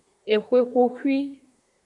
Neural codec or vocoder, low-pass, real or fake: codec, 24 kHz, 1 kbps, SNAC; 10.8 kHz; fake